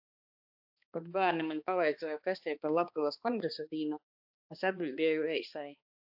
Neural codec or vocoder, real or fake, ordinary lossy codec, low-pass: codec, 16 kHz, 2 kbps, X-Codec, HuBERT features, trained on balanced general audio; fake; AAC, 48 kbps; 5.4 kHz